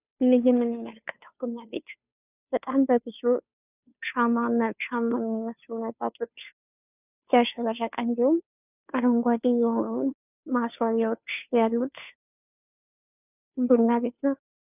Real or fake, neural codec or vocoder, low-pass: fake; codec, 16 kHz, 2 kbps, FunCodec, trained on Chinese and English, 25 frames a second; 3.6 kHz